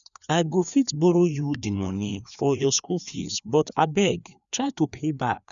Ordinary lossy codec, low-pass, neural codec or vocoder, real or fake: none; 7.2 kHz; codec, 16 kHz, 2 kbps, FreqCodec, larger model; fake